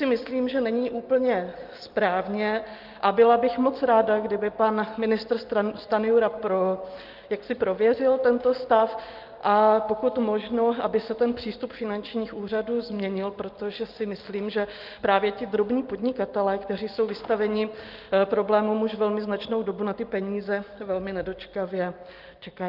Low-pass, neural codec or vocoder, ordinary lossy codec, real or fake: 5.4 kHz; none; Opus, 32 kbps; real